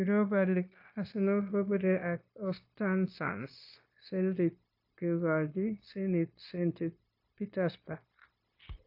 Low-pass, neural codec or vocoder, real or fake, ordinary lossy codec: 5.4 kHz; codec, 16 kHz, 0.9 kbps, LongCat-Audio-Codec; fake; AAC, 48 kbps